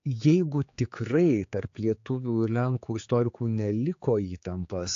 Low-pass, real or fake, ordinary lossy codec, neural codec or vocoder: 7.2 kHz; fake; AAC, 48 kbps; codec, 16 kHz, 4 kbps, X-Codec, HuBERT features, trained on general audio